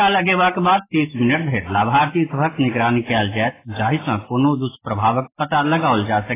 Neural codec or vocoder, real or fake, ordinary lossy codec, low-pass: none; real; AAC, 16 kbps; 3.6 kHz